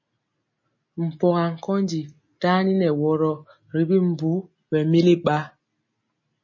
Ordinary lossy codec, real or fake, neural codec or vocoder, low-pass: MP3, 32 kbps; real; none; 7.2 kHz